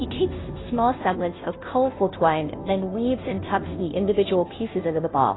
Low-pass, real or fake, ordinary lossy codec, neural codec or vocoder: 7.2 kHz; fake; AAC, 16 kbps; codec, 16 kHz, 0.5 kbps, FunCodec, trained on Chinese and English, 25 frames a second